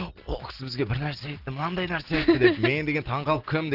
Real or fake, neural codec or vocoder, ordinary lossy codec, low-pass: real; none; Opus, 16 kbps; 5.4 kHz